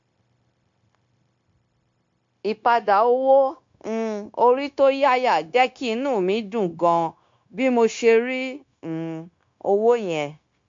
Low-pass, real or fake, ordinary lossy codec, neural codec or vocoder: 7.2 kHz; fake; MP3, 48 kbps; codec, 16 kHz, 0.9 kbps, LongCat-Audio-Codec